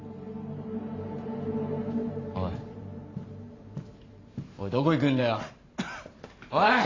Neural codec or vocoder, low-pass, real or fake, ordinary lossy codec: vocoder, 44.1 kHz, 128 mel bands every 512 samples, BigVGAN v2; 7.2 kHz; fake; AAC, 32 kbps